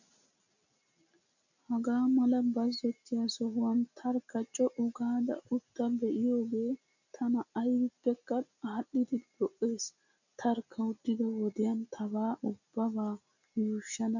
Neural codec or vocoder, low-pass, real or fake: none; 7.2 kHz; real